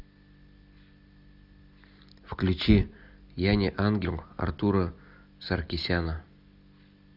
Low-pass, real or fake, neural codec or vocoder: 5.4 kHz; real; none